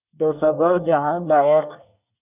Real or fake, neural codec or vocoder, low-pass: fake; codec, 24 kHz, 1 kbps, SNAC; 3.6 kHz